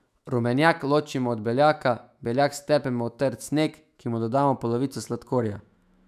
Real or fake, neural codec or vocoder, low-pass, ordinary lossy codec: fake; autoencoder, 48 kHz, 128 numbers a frame, DAC-VAE, trained on Japanese speech; 14.4 kHz; none